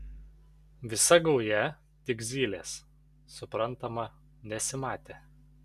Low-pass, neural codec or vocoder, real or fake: 14.4 kHz; none; real